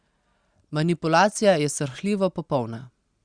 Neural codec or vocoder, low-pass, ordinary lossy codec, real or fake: none; 9.9 kHz; Opus, 64 kbps; real